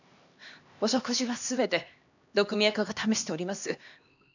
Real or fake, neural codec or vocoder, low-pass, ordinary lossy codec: fake; codec, 16 kHz, 2 kbps, X-Codec, HuBERT features, trained on LibriSpeech; 7.2 kHz; none